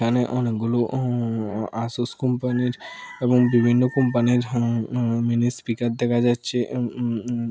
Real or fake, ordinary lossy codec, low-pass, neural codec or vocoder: real; none; none; none